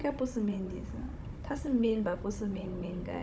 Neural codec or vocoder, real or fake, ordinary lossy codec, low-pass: codec, 16 kHz, 16 kbps, FunCodec, trained on Chinese and English, 50 frames a second; fake; none; none